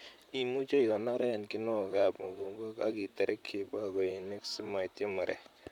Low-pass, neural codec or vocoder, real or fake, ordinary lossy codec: 19.8 kHz; vocoder, 44.1 kHz, 128 mel bands, Pupu-Vocoder; fake; none